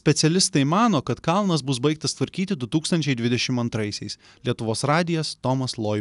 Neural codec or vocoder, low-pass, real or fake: none; 10.8 kHz; real